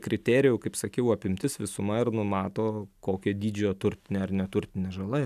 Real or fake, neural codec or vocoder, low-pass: real; none; 14.4 kHz